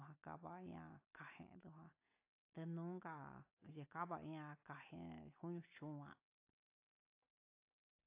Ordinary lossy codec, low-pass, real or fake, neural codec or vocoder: AAC, 24 kbps; 3.6 kHz; real; none